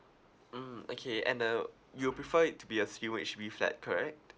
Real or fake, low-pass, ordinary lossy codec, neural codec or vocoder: real; none; none; none